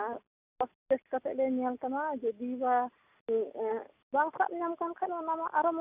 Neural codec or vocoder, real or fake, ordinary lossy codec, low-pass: none; real; Opus, 64 kbps; 3.6 kHz